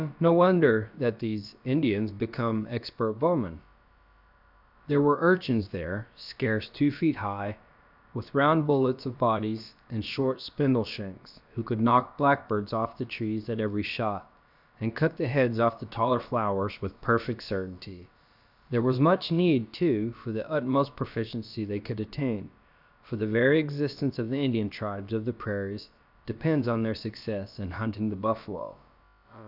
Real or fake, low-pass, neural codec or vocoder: fake; 5.4 kHz; codec, 16 kHz, about 1 kbps, DyCAST, with the encoder's durations